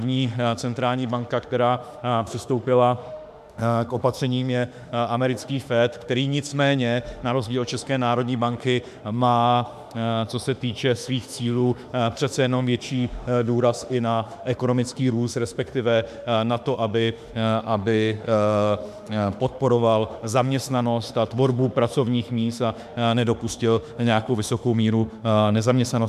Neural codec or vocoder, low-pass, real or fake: autoencoder, 48 kHz, 32 numbers a frame, DAC-VAE, trained on Japanese speech; 14.4 kHz; fake